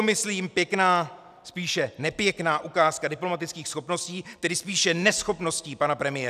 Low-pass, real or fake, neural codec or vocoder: 14.4 kHz; real; none